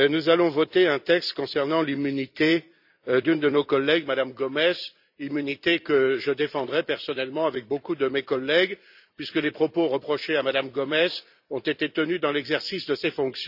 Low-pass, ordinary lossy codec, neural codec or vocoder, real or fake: 5.4 kHz; none; vocoder, 44.1 kHz, 128 mel bands every 256 samples, BigVGAN v2; fake